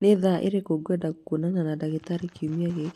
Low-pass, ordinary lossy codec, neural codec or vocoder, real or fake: 14.4 kHz; AAC, 64 kbps; none; real